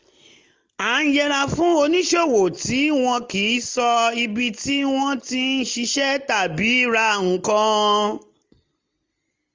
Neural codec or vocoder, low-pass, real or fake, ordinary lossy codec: none; 7.2 kHz; real; Opus, 32 kbps